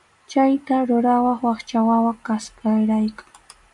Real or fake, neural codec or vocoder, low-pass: real; none; 10.8 kHz